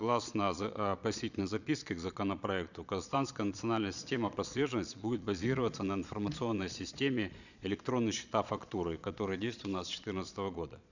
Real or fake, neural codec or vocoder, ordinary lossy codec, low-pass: fake; vocoder, 22.05 kHz, 80 mel bands, Vocos; none; 7.2 kHz